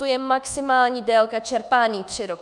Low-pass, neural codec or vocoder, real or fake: 10.8 kHz; codec, 24 kHz, 1.2 kbps, DualCodec; fake